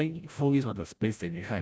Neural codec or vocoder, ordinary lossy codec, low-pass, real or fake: codec, 16 kHz, 0.5 kbps, FreqCodec, larger model; none; none; fake